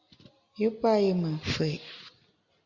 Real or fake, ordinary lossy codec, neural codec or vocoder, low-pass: real; Opus, 64 kbps; none; 7.2 kHz